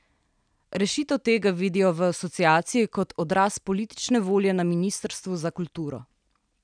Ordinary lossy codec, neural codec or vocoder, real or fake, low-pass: none; none; real; 9.9 kHz